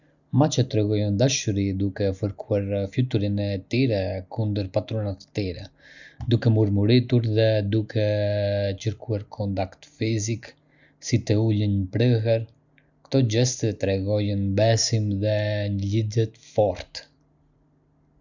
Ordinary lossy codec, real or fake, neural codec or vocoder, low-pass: none; real; none; 7.2 kHz